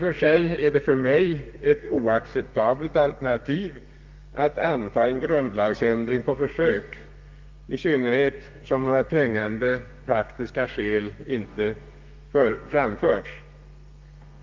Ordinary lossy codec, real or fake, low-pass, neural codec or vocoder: Opus, 24 kbps; fake; 7.2 kHz; codec, 32 kHz, 1.9 kbps, SNAC